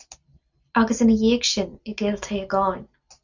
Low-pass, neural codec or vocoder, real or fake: 7.2 kHz; none; real